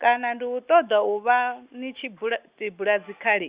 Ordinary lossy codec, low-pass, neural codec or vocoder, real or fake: Opus, 64 kbps; 3.6 kHz; none; real